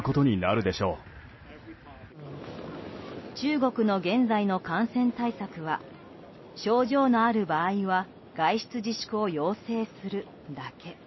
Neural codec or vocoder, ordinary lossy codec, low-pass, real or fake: none; MP3, 24 kbps; 7.2 kHz; real